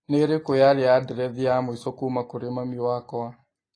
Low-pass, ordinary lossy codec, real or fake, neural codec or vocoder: 9.9 kHz; AAC, 32 kbps; real; none